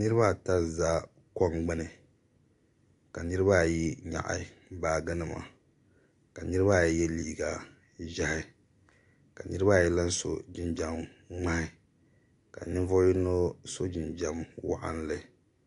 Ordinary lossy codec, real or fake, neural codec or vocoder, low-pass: AAC, 64 kbps; real; none; 10.8 kHz